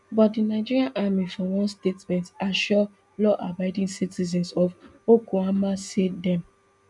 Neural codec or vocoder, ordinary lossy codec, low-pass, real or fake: none; none; 10.8 kHz; real